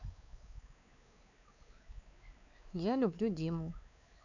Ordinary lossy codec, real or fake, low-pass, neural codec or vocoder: none; fake; 7.2 kHz; codec, 16 kHz, 4 kbps, X-Codec, WavLM features, trained on Multilingual LibriSpeech